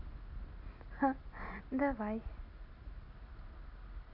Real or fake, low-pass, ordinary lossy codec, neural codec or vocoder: real; 5.4 kHz; none; none